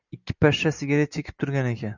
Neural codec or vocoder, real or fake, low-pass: none; real; 7.2 kHz